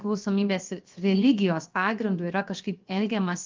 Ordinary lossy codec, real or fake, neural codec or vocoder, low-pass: Opus, 32 kbps; fake; codec, 16 kHz, 0.7 kbps, FocalCodec; 7.2 kHz